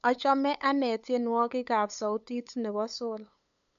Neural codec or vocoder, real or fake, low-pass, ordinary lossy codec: codec, 16 kHz, 8 kbps, FunCodec, trained on LibriTTS, 25 frames a second; fake; 7.2 kHz; none